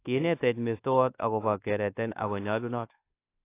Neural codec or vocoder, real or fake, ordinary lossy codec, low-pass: codec, 24 kHz, 1.2 kbps, DualCodec; fake; AAC, 24 kbps; 3.6 kHz